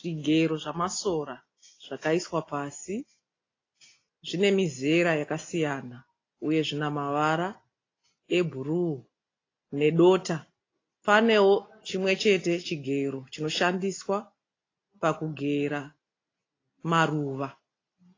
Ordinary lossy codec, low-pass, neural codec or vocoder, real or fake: AAC, 32 kbps; 7.2 kHz; none; real